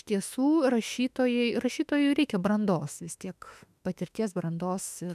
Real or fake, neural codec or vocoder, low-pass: fake; autoencoder, 48 kHz, 32 numbers a frame, DAC-VAE, trained on Japanese speech; 14.4 kHz